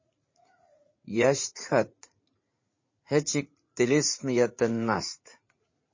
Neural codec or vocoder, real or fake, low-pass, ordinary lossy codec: vocoder, 44.1 kHz, 80 mel bands, Vocos; fake; 7.2 kHz; MP3, 32 kbps